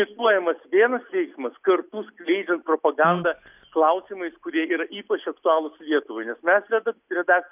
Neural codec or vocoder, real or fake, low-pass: none; real; 3.6 kHz